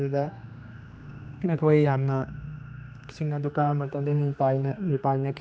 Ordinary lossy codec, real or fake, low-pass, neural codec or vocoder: none; fake; none; codec, 16 kHz, 2 kbps, X-Codec, HuBERT features, trained on general audio